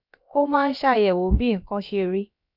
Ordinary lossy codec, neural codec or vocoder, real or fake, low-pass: none; codec, 16 kHz, about 1 kbps, DyCAST, with the encoder's durations; fake; 5.4 kHz